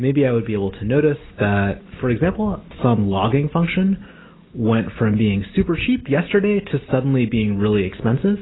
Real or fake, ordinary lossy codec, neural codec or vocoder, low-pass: real; AAC, 16 kbps; none; 7.2 kHz